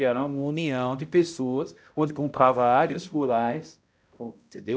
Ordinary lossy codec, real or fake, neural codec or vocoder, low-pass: none; fake; codec, 16 kHz, 0.5 kbps, X-Codec, HuBERT features, trained on balanced general audio; none